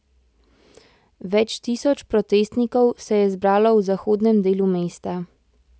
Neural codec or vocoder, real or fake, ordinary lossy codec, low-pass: none; real; none; none